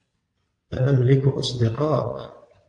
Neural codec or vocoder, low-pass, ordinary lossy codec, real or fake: vocoder, 22.05 kHz, 80 mel bands, WaveNeXt; 9.9 kHz; AAC, 48 kbps; fake